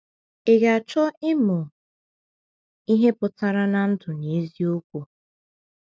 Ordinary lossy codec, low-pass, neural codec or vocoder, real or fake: none; none; none; real